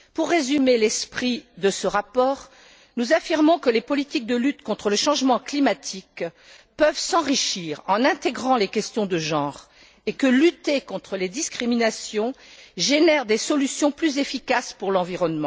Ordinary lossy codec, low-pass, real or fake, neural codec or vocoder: none; none; real; none